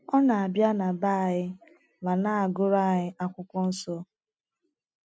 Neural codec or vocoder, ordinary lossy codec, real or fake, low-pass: none; none; real; none